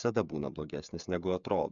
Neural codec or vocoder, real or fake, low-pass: codec, 16 kHz, 8 kbps, FreqCodec, smaller model; fake; 7.2 kHz